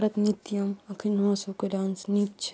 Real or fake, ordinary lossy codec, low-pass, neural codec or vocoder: real; none; none; none